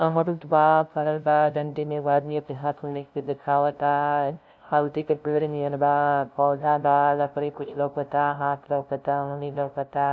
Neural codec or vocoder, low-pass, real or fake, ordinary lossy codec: codec, 16 kHz, 0.5 kbps, FunCodec, trained on LibriTTS, 25 frames a second; none; fake; none